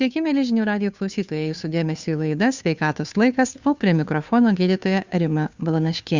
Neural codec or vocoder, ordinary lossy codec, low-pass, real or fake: codec, 16 kHz, 2 kbps, FunCodec, trained on Chinese and English, 25 frames a second; Opus, 64 kbps; 7.2 kHz; fake